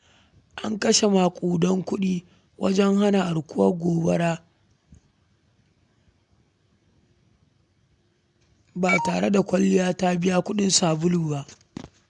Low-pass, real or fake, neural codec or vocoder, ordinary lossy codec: 10.8 kHz; real; none; none